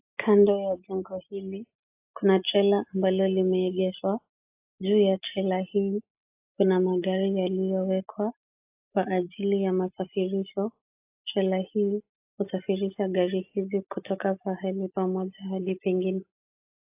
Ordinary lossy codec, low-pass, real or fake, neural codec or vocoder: AAC, 32 kbps; 3.6 kHz; real; none